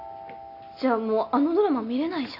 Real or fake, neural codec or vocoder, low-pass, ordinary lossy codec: real; none; 5.4 kHz; none